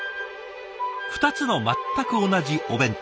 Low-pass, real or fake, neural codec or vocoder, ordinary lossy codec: none; real; none; none